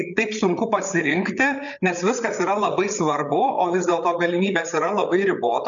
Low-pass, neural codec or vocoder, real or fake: 7.2 kHz; codec, 16 kHz, 8 kbps, FreqCodec, larger model; fake